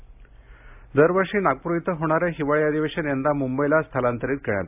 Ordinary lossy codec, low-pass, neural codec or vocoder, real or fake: AAC, 32 kbps; 3.6 kHz; none; real